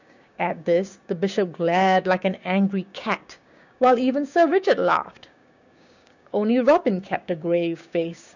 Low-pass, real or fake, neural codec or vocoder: 7.2 kHz; fake; codec, 16 kHz, 6 kbps, DAC